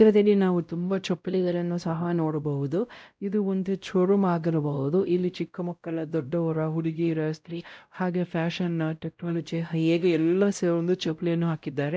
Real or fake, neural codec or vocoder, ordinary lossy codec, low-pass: fake; codec, 16 kHz, 0.5 kbps, X-Codec, WavLM features, trained on Multilingual LibriSpeech; none; none